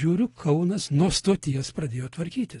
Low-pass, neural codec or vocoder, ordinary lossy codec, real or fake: 10.8 kHz; none; AAC, 32 kbps; real